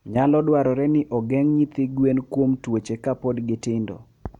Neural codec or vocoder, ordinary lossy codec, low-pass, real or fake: none; MP3, 96 kbps; 19.8 kHz; real